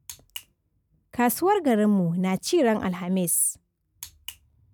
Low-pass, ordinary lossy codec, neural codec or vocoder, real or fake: none; none; none; real